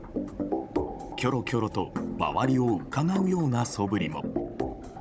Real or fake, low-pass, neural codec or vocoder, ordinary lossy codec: fake; none; codec, 16 kHz, 16 kbps, FunCodec, trained on Chinese and English, 50 frames a second; none